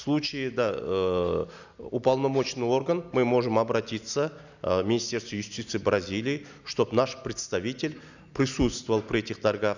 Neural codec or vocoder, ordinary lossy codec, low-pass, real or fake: none; none; 7.2 kHz; real